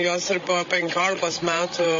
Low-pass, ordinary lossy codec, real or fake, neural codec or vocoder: 7.2 kHz; MP3, 32 kbps; fake; codec, 16 kHz, 16 kbps, FreqCodec, larger model